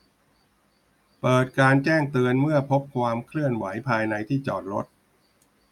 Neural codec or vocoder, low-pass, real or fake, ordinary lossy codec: none; 19.8 kHz; real; none